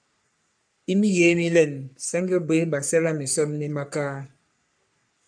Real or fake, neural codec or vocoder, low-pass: fake; codec, 44.1 kHz, 3.4 kbps, Pupu-Codec; 9.9 kHz